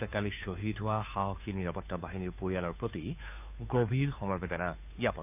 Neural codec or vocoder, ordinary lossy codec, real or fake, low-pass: codec, 16 kHz, 2 kbps, FunCodec, trained on Chinese and English, 25 frames a second; none; fake; 3.6 kHz